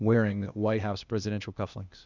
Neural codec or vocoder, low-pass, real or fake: codec, 16 kHz, 0.8 kbps, ZipCodec; 7.2 kHz; fake